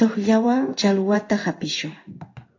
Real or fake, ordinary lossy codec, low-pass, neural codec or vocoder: fake; AAC, 48 kbps; 7.2 kHz; codec, 16 kHz in and 24 kHz out, 1 kbps, XY-Tokenizer